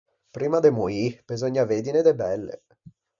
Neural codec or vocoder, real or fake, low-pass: none; real; 7.2 kHz